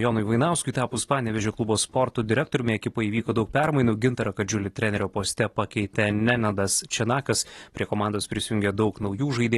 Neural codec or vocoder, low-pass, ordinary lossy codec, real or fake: none; 19.8 kHz; AAC, 32 kbps; real